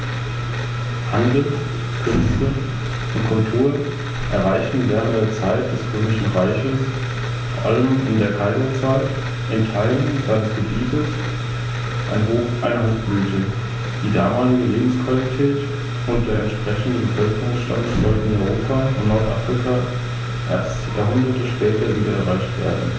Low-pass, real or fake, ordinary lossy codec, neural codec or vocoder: none; real; none; none